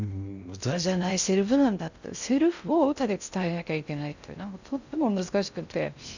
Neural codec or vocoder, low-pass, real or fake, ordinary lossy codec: codec, 16 kHz in and 24 kHz out, 0.6 kbps, FocalCodec, streaming, 2048 codes; 7.2 kHz; fake; none